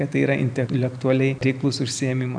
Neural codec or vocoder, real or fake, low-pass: none; real; 9.9 kHz